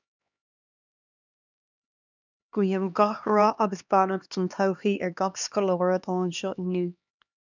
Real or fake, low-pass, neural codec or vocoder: fake; 7.2 kHz; codec, 16 kHz, 2 kbps, X-Codec, HuBERT features, trained on LibriSpeech